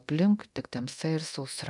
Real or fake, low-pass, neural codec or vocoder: fake; 10.8 kHz; codec, 24 kHz, 0.9 kbps, DualCodec